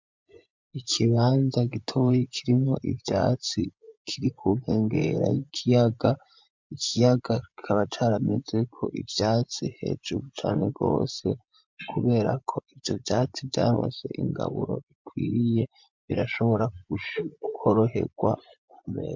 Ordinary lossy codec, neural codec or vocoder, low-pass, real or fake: MP3, 64 kbps; vocoder, 24 kHz, 100 mel bands, Vocos; 7.2 kHz; fake